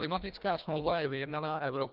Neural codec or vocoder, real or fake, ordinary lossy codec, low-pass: codec, 24 kHz, 1.5 kbps, HILCodec; fake; Opus, 24 kbps; 5.4 kHz